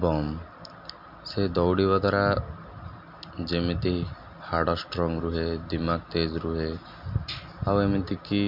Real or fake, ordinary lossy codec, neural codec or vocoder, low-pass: real; MP3, 48 kbps; none; 5.4 kHz